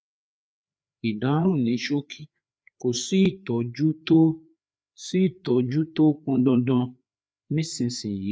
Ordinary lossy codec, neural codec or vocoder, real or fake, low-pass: none; codec, 16 kHz, 4 kbps, FreqCodec, larger model; fake; none